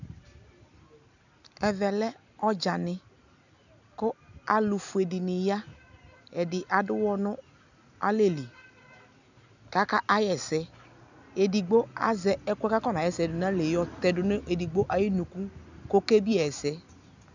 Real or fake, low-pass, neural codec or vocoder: real; 7.2 kHz; none